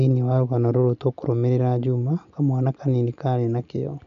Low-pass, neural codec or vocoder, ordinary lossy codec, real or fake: 7.2 kHz; none; none; real